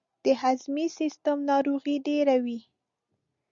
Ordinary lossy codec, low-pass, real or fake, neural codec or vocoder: Opus, 64 kbps; 7.2 kHz; real; none